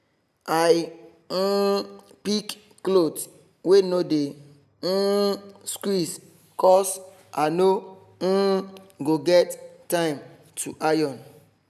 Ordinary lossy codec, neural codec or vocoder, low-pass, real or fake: none; none; 14.4 kHz; real